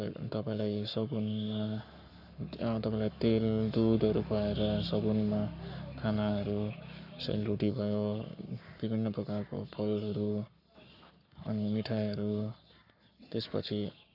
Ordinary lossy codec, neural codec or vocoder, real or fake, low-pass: none; codec, 44.1 kHz, 7.8 kbps, Pupu-Codec; fake; 5.4 kHz